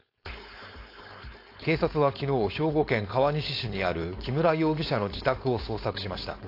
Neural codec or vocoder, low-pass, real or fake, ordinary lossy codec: codec, 16 kHz, 4.8 kbps, FACodec; 5.4 kHz; fake; AAC, 32 kbps